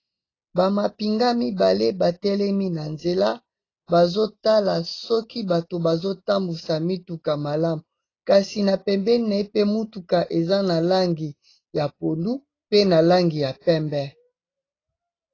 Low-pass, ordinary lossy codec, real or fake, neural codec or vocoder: 7.2 kHz; AAC, 32 kbps; real; none